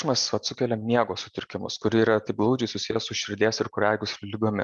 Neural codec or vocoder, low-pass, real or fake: none; 10.8 kHz; real